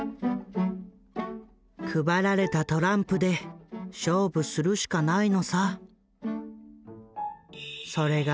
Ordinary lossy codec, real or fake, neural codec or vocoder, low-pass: none; real; none; none